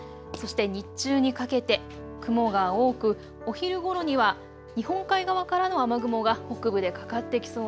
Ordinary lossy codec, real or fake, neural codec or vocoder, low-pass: none; real; none; none